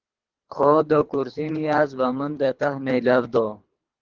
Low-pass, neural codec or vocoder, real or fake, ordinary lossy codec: 7.2 kHz; codec, 24 kHz, 3 kbps, HILCodec; fake; Opus, 16 kbps